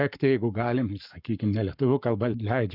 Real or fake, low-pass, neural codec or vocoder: fake; 5.4 kHz; codec, 44.1 kHz, 7.8 kbps, Pupu-Codec